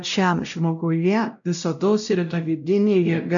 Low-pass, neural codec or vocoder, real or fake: 7.2 kHz; codec, 16 kHz, 0.5 kbps, X-Codec, WavLM features, trained on Multilingual LibriSpeech; fake